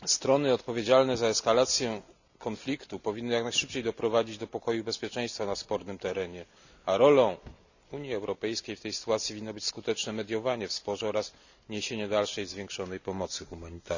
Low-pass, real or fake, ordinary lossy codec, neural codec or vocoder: 7.2 kHz; real; none; none